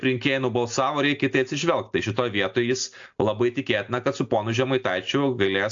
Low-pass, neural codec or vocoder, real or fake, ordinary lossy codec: 7.2 kHz; none; real; AAC, 64 kbps